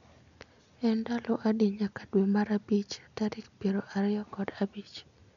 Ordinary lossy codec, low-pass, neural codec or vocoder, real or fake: none; 7.2 kHz; none; real